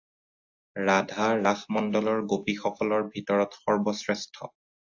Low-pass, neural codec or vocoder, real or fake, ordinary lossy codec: 7.2 kHz; none; real; AAC, 48 kbps